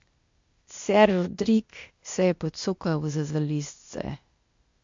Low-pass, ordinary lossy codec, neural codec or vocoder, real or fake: 7.2 kHz; MP3, 48 kbps; codec, 16 kHz, 0.8 kbps, ZipCodec; fake